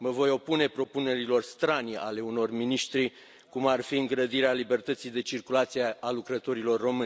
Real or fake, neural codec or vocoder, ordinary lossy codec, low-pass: real; none; none; none